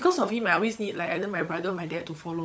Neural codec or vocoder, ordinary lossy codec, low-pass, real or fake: codec, 16 kHz, 16 kbps, FunCodec, trained on LibriTTS, 50 frames a second; none; none; fake